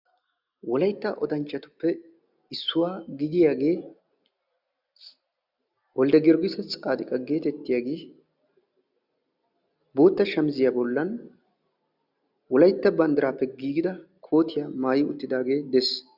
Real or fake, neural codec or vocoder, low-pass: real; none; 5.4 kHz